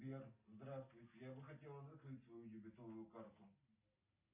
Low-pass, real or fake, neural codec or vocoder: 3.6 kHz; real; none